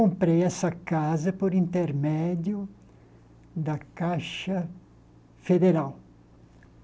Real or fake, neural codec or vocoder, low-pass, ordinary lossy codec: real; none; none; none